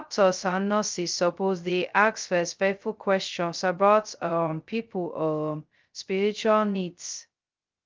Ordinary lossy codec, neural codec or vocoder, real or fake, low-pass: Opus, 24 kbps; codec, 16 kHz, 0.2 kbps, FocalCodec; fake; 7.2 kHz